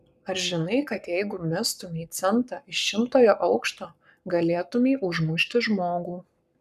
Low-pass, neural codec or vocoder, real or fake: 14.4 kHz; codec, 44.1 kHz, 7.8 kbps, Pupu-Codec; fake